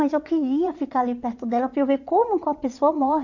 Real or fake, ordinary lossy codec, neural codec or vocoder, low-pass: fake; none; vocoder, 22.05 kHz, 80 mel bands, WaveNeXt; 7.2 kHz